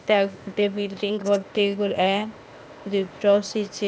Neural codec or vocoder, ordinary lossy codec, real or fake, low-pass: codec, 16 kHz, 0.8 kbps, ZipCodec; none; fake; none